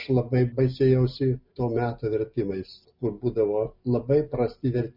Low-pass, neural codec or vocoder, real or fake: 5.4 kHz; none; real